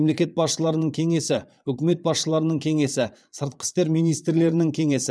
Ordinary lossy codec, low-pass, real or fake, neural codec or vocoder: none; none; fake; vocoder, 22.05 kHz, 80 mel bands, Vocos